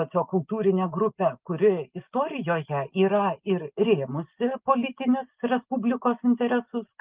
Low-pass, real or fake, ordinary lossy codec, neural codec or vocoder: 3.6 kHz; real; Opus, 24 kbps; none